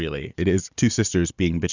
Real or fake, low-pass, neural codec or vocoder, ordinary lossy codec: real; 7.2 kHz; none; Opus, 64 kbps